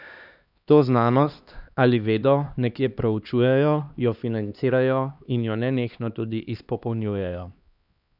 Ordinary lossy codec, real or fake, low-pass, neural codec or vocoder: none; fake; 5.4 kHz; codec, 16 kHz, 2 kbps, X-Codec, HuBERT features, trained on LibriSpeech